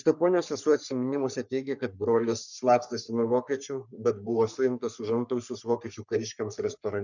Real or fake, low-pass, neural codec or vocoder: fake; 7.2 kHz; codec, 44.1 kHz, 3.4 kbps, Pupu-Codec